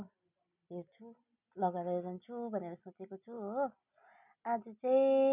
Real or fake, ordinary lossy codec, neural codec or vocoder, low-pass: real; none; none; 3.6 kHz